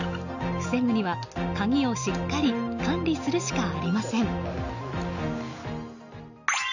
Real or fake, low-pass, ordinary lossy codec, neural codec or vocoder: real; 7.2 kHz; none; none